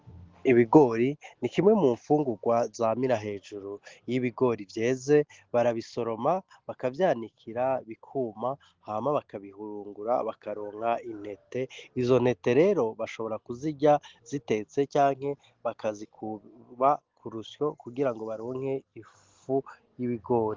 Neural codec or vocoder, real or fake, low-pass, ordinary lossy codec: none; real; 7.2 kHz; Opus, 16 kbps